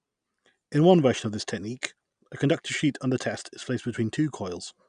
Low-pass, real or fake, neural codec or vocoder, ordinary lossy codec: 9.9 kHz; real; none; none